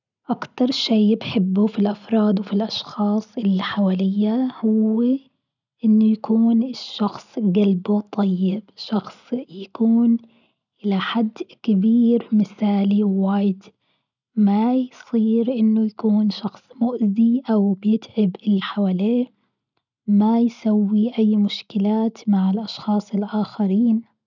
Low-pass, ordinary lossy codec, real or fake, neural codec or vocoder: 7.2 kHz; none; real; none